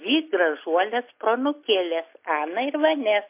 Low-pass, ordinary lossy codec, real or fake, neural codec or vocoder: 3.6 kHz; MP3, 24 kbps; real; none